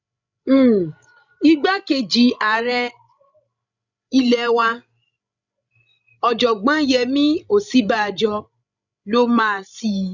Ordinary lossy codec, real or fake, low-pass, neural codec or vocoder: none; fake; 7.2 kHz; codec, 16 kHz, 16 kbps, FreqCodec, larger model